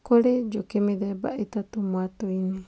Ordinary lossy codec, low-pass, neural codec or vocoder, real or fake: none; none; none; real